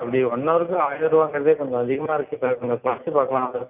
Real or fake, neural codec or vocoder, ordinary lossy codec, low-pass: real; none; none; 3.6 kHz